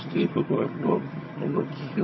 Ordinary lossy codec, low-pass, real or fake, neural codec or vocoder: MP3, 24 kbps; 7.2 kHz; fake; vocoder, 22.05 kHz, 80 mel bands, HiFi-GAN